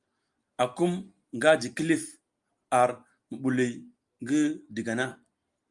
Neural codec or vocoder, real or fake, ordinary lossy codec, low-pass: none; real; Opus, 32 kbps; 10.8 kHz